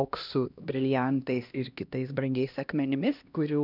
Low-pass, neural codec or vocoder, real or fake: 5.4 kHz; codec, 16 kHz, 1 kbps, X-Codec, HuBERT features, trained on LibriSpeech; fake